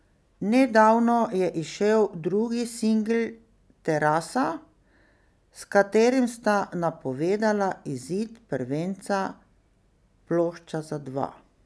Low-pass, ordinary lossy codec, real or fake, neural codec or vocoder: none; none; real; none